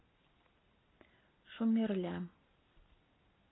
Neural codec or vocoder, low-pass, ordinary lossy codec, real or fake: none; 7.2 kHz; AAC, 16 kbps; real